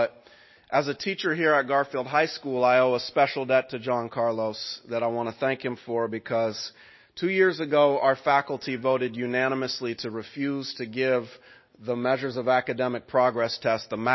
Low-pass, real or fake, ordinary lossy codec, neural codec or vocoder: 7.2 kHz; real; MP3, 24 kbps; none